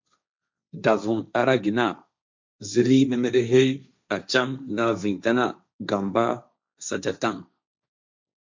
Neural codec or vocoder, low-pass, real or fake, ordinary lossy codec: codec, 16 kHz, 1.1 kbps, Voila-Tokenizer; 7.2 kHz; fake; MP3, 64 kbps